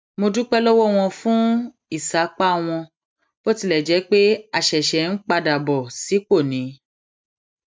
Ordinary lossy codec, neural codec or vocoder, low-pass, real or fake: none; none; none; real